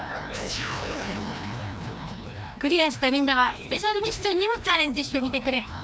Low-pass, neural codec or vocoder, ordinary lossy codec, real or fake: none; codec, 16 kHz, 1 kbps, FreqCodec, larger model; none; fake